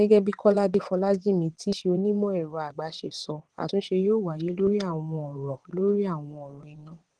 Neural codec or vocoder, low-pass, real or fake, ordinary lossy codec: vocoder, 22.05 kHz, 80 mel bands, WaveNeXt; 9.9 kHz; fake; Opus, 16 kbps